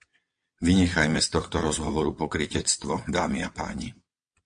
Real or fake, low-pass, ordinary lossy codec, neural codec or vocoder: fake; 9.9 kHz; MP3, 48 kbps; vocoder, 22.05 kHz, 80 mel bands, WaveNeXt